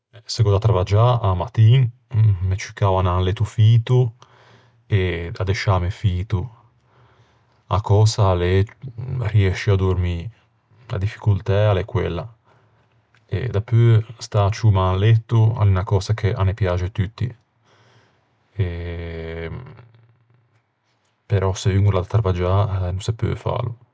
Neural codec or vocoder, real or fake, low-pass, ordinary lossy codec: none; real; none; none